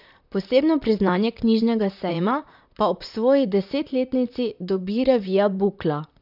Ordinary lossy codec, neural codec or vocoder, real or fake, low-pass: none; vocoder, 44.1 kHz, 128 mel bands, Pupu-Vocoder; fake; 5.4 kHz